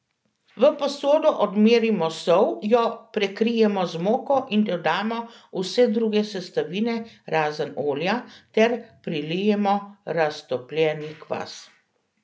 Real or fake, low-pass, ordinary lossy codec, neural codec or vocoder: real; none; none; none